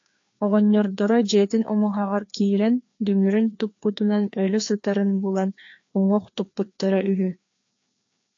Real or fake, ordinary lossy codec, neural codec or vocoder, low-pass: fake; AAC, 48 kbps; codec, 16 kHz, 2 kbps, FreqCodec, larger model; 7.2 kHz